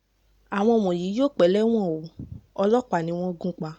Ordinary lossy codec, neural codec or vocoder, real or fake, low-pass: none; none; real; 19.8 kHz